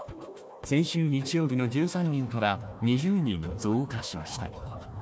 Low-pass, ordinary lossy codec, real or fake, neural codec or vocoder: none; none; fake; codec, 16 kHz, 1 kbps, FunCodec, trained on Chinese and English, 50 frames a second